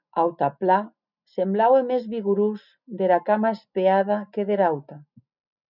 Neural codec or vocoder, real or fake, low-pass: none; real; 5.4 kHz